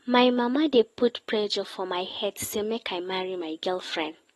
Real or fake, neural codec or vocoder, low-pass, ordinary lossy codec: real; none; 19.8 kHz; AAC, 32 kbps